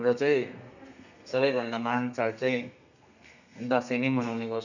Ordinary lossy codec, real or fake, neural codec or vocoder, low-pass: none; fake; codec, 32 kHz, 1.9 kbps, SNAC; 7.2 kHz